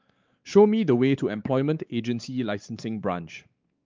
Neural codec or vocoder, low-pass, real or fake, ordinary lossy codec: codec, 16 kHz, 4 kbps, X-Codec, WavLM features, trained on Multilingual LibriSpeech; 7.2 kHz; fake; Opus, 24 kbps